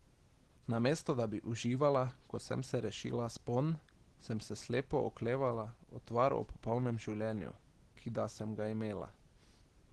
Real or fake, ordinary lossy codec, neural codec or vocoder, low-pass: real; Opus, 16 kbps; none; 10.8 kHz